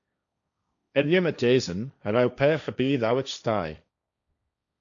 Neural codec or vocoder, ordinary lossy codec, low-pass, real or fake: codec, 16 kHz, 1.1 kbps, Voila-Tokenizer; MP3, 96 kbps; 7.2 kHz; fake